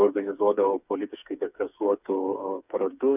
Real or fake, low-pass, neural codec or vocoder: fake; 3.6 kHz; codec, 16 kHz, 4 kbps, FreqCodec, smaller model